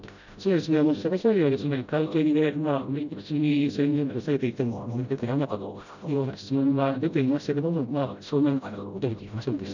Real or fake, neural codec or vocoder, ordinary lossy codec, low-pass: fake; codec, 16 kHz, 0.5 kbps, FreqCodec, smaller model; none; 7.2 kHz